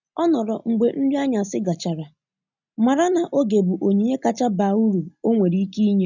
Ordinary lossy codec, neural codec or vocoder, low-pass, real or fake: none; none; 7.2 kHz; real